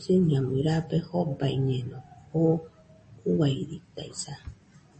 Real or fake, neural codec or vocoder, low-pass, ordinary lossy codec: real; none; 10.8 kHz; MP3, 32 kbps